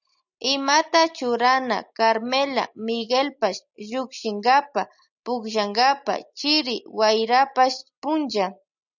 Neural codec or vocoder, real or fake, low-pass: none; real; 7.2 kHz